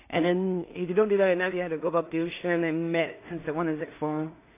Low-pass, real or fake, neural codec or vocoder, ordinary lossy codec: 3.6 kHz; fake; codec, 16 kHz in and 24 kHz out, 0.4 kbps, LongCat-Audio-Codec, two codebook decoder; AAC, 24 kbps